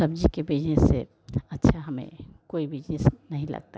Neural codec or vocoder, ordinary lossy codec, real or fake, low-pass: none; none; real; none